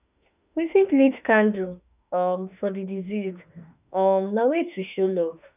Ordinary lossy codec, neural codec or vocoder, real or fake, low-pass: none; autoencoder, 48 kHz, 32 numbers a frame, DAC-VAE, trained on Japanese speech; fake; 3.6 kHz